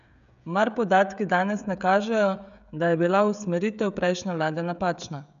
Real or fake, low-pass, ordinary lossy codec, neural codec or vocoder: fake; 7.2 kHz; none; codec, 16 kHz, 16 kbps, FreqCodec, smaller model